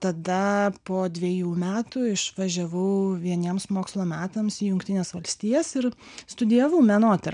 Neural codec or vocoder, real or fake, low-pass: none; real; 9.9 kHz